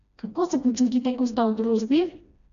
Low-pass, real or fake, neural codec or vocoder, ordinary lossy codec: 7.2 kHz; fake; codec, 16 kHz, 1 kbps, FreqCodec, smaller model; none